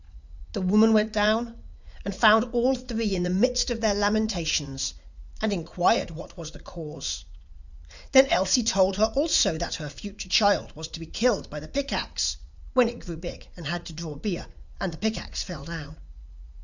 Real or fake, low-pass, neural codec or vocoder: fake; 7.2 kHz; vocoder, 44.1 kHz, 80 mel bands, Vocos